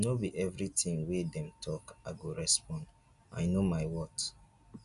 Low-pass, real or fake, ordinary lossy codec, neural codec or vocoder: 10.8 kHz; real; none; none